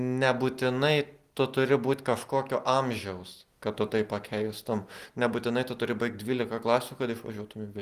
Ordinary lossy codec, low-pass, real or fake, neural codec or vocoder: Opus, 24 kbps; 14.4 kHz; real; none